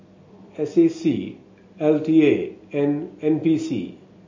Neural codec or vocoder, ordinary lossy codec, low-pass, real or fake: none; MP3, 32 kbps; 7.2 kHz; real